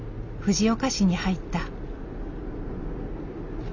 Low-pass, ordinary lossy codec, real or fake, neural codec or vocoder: 7.2 kHz; none; real; none